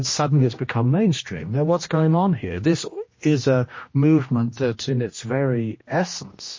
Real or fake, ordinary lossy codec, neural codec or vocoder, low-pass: fake; MP3, 32 kbps; codec, 16 kHz, 1 kbps, X-Codec, HuBERT features, trained on general audio; 7.2 kHz